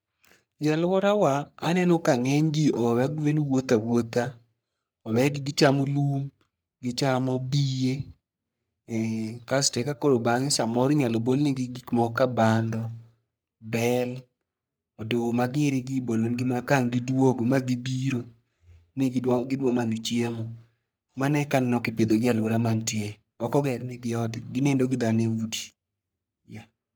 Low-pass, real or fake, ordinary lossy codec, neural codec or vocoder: none; fake; none; codec, 44.1 kHz, 3.4 kbps, Pupu-Codec